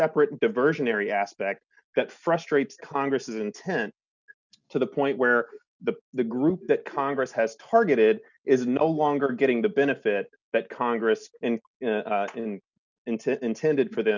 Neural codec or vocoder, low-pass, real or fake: none; 7.2 kHz; real